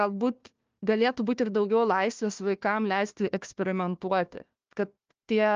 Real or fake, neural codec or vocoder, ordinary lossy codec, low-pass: fake; codec, 16 kHz, 1 kbps, FunCodec, trained on Chinese and English, 50 frames a second; Opus, 24 kbps; 7.2 kHz